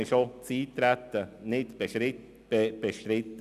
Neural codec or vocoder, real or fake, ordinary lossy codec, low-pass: none; real; none; 14.4 kHz